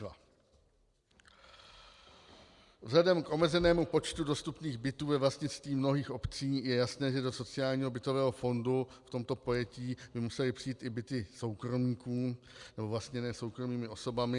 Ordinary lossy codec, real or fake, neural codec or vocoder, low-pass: Opus, 64 kbps; real; none; 10.8 kHz